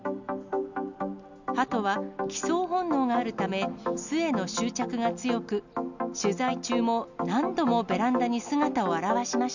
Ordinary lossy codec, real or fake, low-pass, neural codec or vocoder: none; real; 7.2 kHz; none